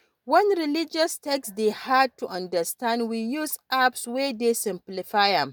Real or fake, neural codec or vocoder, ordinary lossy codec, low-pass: real; none; none; none